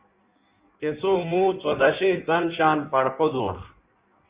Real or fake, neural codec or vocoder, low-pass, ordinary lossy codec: fake; codec, 16 kHz in and 24 kHz out, 1.1 kbps, FireRedTTS-2 codec; 3.6 kHz; Opus, 64 kbps